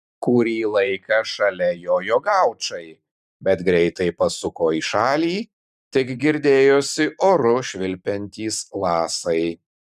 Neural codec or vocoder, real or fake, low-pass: vocoder, 44.1 kHz, 128 mel bands every 512 samples, BigVGAN v2; fake; 14.4 kHz